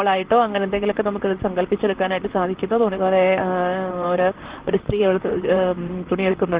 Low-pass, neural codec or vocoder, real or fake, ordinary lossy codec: 3.6 kHz; vocoder, 44.1 kHz, 128 mel bands, Pupu-Vocoder; fake; Opus, 16 kbps